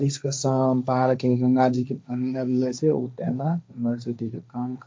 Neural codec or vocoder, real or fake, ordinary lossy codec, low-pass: codec, 16 kHz, 1.1 kbps, Voila-Tokenizer; fake; none; none